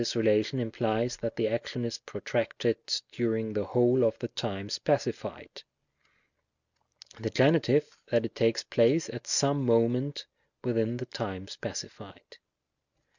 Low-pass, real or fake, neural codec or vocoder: 7.2 kHz; real; none